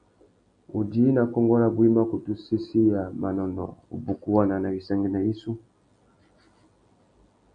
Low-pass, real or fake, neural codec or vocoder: 9.9 kHz; real; none